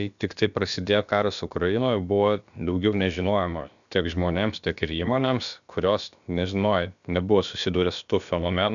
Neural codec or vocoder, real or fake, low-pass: codec, 16 kHz, about 1 kbps, DyCAST, with the encoder's durations; fake; 7.2 kHz